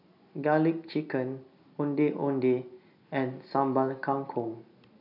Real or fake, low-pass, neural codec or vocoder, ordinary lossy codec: real; 5.4 kHz; none; none